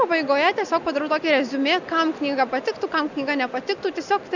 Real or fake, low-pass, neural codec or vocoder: real; 7.2 kHz; none